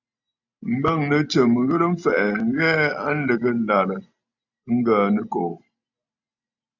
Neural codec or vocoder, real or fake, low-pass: none; real; 7.2 kHz